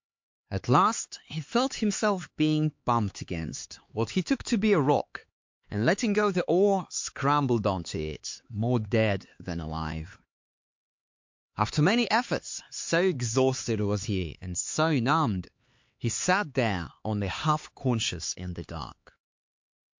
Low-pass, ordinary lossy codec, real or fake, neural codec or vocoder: 7.2 kHz; MP3, 48 kbps; fake; codec, 16 kHz, 4 kbps, X-Codec, HuBERT features, trained on LibriSpeech